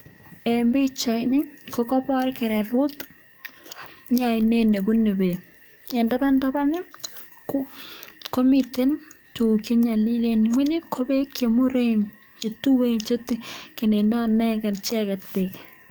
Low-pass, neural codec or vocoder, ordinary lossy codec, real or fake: none; codec, 44.1 kHz, 7.8 kbps, DAC; none; fake